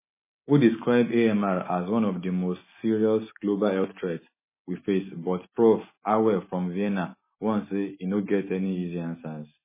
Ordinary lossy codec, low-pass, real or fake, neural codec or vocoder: MP3, 16 kbps; 3.6 kHz; real; none